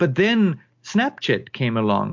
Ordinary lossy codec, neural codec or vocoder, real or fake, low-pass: MP3, 48 kbps; none; real; 7.2 kHz